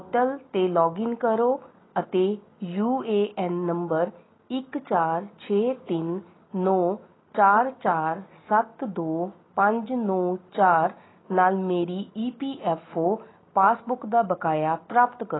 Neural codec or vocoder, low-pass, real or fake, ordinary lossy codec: none; 7.2 kHz; real; AAC, 16 kbps